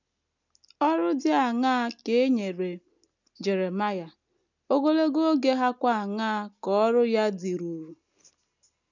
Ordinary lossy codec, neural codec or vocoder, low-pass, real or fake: none; none; 7.2 kHz; real